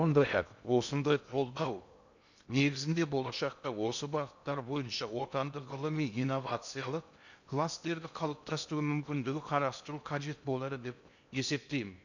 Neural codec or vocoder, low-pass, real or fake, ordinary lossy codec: codec, 16 kHz in and 24 kHz out, 0.6 kbps, FocalCodec, streaming, 2048 codes; 7.2 kHz; fake; none